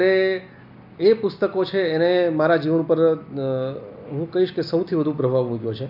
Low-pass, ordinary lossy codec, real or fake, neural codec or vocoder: 5.4 kHz; AAC, 48 kbps; real; none